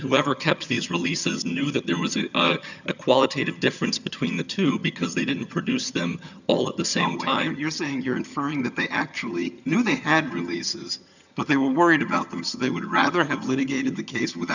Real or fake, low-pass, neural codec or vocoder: fake; 7.2 kHz; vocoder, 22.05 kHz, 80 mel bands, HiFi-GAN